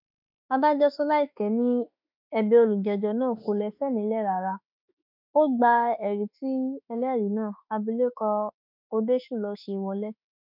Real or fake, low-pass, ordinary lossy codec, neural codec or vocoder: fake; 5.4 kHz; none; autoencoder, 48 kHz, 32 numbers a frame, DAC-VAE, trained on Japanese speech